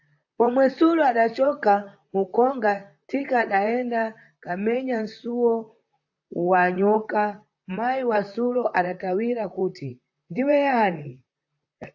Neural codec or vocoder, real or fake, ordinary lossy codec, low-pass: vocoder, 44.1 kHz, 128 mel bands, Pupu-Vocoder; fake; AAC, 48 kbps; 7.2 kHz